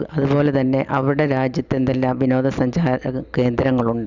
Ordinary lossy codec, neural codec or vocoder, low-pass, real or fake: Opus, 64 kbps; none; 7.2 kHz; real